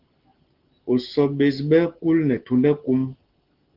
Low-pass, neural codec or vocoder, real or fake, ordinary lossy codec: 5.4 kHz; none; real; Opus, 16 kbps